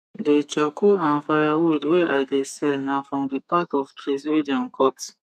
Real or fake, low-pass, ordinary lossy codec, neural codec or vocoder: fake; 14.4 kHz; none; codec, 32 kHz, 1.9 kbps, SNAC